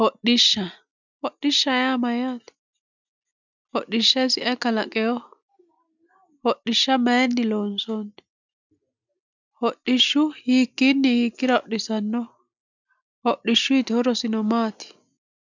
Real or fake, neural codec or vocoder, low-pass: real; none; 7.2 kHz